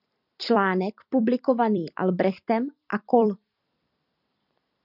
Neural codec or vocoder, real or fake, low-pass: vocoder, 44.1 kHz, 128 mel bands every 256 samples, BigVGAN v2; fake; 5.4 kHz